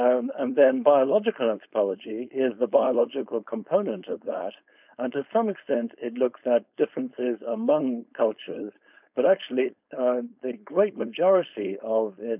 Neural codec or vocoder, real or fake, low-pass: codec, 16 kHz, 4.8 kbps, FACodec; fake; 3.6 kHz